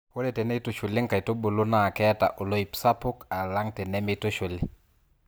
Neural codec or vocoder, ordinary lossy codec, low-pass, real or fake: none; none; none; real